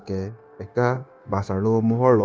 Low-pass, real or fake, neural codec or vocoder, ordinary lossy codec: none; fake; codec, 16 kHz, 0.9 kbps, LongCat-Audio-Codec; none